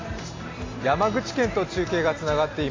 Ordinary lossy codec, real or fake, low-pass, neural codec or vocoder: AAC, 32 kbps; real; 7.2 kHz; none